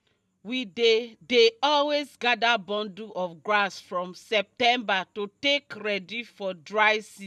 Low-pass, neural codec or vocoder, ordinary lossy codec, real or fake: none; none; none; real